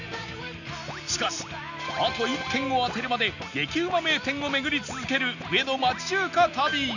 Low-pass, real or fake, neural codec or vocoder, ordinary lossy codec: 7.2 kHz; real; none; none